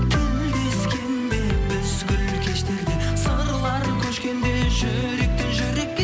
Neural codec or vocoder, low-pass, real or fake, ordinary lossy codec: none; none; real; none